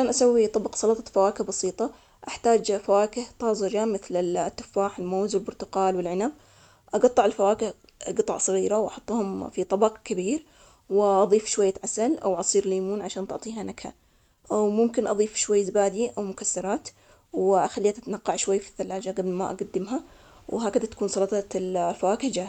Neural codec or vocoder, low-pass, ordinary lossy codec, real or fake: none; 19.8 kHz; none; real